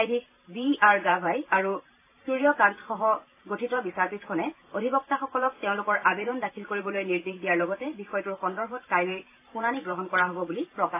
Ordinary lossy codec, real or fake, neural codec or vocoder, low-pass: AAC, 24 kbps; fake; vocoder, 44.1 kHz, 128 mel bands every 512 samples, BigVGAN v2; 3.6 kHz